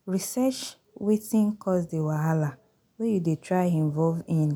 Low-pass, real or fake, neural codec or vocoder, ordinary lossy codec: none; real; none; none